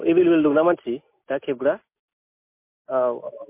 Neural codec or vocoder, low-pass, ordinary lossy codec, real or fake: none; 3.6 kHz; MP3, 24 kbps; real